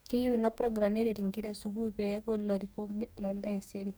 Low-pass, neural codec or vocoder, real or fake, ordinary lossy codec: none; codec, 44.1 kHz, 2.6 kbps, DAC; fake; none